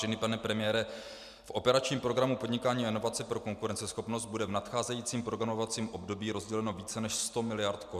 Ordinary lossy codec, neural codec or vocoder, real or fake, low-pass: MP3, 96 kbps; none; real; 14.4 kHz